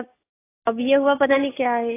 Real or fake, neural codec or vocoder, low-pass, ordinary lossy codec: real; none; 3.6 kHz; AAC, 16 kbps